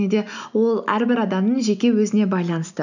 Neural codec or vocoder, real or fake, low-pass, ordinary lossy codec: none; real; 7.2 kHz; none